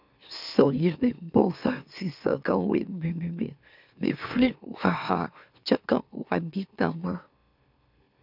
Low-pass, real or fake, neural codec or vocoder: 5.4 kHz; fake; autoencoder, 44.1 kHz, a latent of 192 numbers a frame, MeloTTS